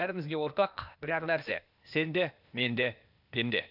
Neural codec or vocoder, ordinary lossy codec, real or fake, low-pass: codec, 16 kHz, 0.8 kbps, ZipCodec; none; fake; 5.4 kHz